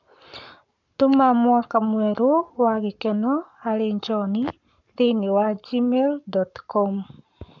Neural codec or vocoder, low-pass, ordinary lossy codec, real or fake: codec, 44.1 kHz, 7.8 kbps, Pupu-Codec; 7.2 kHz; none; fake